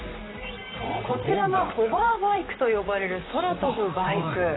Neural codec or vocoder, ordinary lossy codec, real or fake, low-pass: vocoder, 44.1 kHz, 128 mel bands, Pupu-Vocoder; AAC, 16 kbps; fake; 7.2 kHz